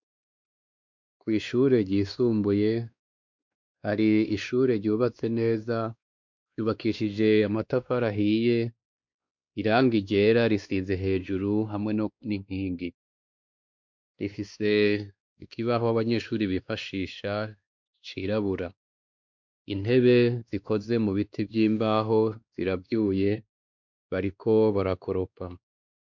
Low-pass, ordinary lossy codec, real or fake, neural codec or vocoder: 7.2 kHz; MP3, 64 kbps; fake; codec, 16 kHz, 2 kbps, X-Codec, WavLM features, trained on Multilingual LibriSpeech